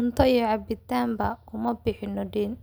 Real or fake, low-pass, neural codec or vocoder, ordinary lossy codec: real; none; none; none